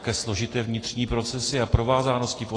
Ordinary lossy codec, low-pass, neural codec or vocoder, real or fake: AAC, 32 kbps; 9.9 kHz; none; real